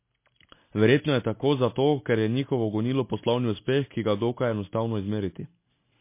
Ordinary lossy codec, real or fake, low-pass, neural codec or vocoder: MP3, 24 kbps; real; 3.6 kHz; none